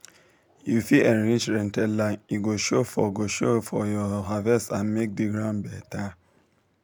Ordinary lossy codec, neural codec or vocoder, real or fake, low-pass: none; none; real; none